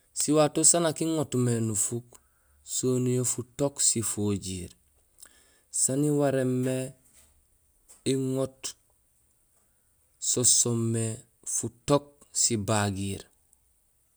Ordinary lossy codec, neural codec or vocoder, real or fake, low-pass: none; none; real; none